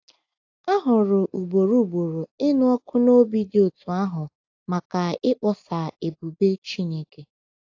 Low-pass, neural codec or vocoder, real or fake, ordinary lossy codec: 7.2 kHz; none; real; AAC, 48 kbps